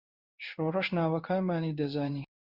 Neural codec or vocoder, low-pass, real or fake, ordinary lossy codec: codec, 16 kHz in and 24 kHz out, 1 kbps, XY-Tokenizer; 5.4 kHz; fake; Opus, 64 kbps